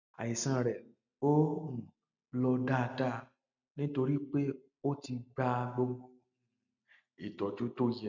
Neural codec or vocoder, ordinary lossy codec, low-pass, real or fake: none; none; 7.2 kHz; real